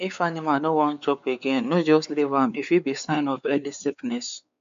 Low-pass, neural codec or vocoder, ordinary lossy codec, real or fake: 7.2 kHz; codec, 16 kHz, 4 kbps, X-Codec, WavLM features, trained on Multilingual LibriSpeech; none; fake